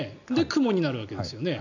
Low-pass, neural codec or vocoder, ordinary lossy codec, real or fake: 7.2 kHz; none; none; real